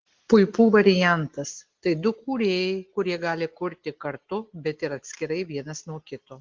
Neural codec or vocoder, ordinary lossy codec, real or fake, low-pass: none; Opus, 16 kbps; real; 7.2 kHz